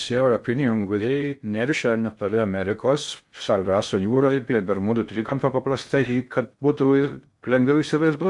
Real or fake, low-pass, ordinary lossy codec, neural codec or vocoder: fake; 10.8 kHz; MP3, 64 kbps; codec, 16 kHz in and 24 kHz out, 0.6 kbps, FocalCodec, streaming, 2048 codes